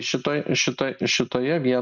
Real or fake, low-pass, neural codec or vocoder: real; 7.2 kHz; none